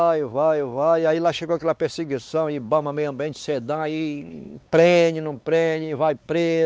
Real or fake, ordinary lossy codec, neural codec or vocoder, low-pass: fake; none; codec, 16 kHz, 4 kbps, X-Codec, WavLM features, trained on Multilingual LibriSpeech; none